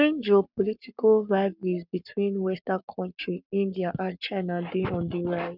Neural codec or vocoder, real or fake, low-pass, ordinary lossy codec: codec, 44.1 kHz, 7.8 kbps, Pupu-Codec; fake; 5.4 kHz; none